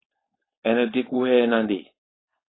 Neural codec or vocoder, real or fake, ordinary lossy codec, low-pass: codec, 16 kHz, 4.8 kbps, FACodec; fake; AAC, 16 kbps; 7.2 kHz